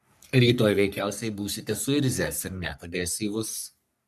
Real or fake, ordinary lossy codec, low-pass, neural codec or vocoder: fake; MP3, 96 kbps; 14.4 kHz; codec, 44.1 kHz, 3.4 kbps, Pupu-Codec